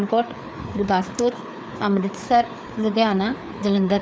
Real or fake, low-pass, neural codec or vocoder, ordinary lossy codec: fake; none; codec, 16 kHz, 4 kbps, FreqCodec, larger model; none